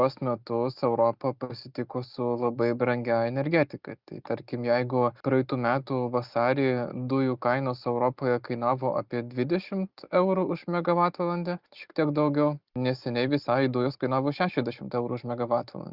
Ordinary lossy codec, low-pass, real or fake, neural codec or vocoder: Opus, 64 kbps; 5.4 kHz; real; none